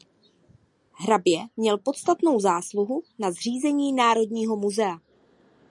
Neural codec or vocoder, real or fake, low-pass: none; real; 10.8 kHz